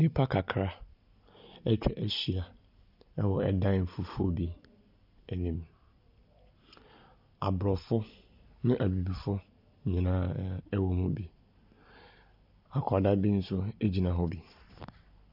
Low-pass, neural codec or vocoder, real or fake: 5.4 kHz; none; real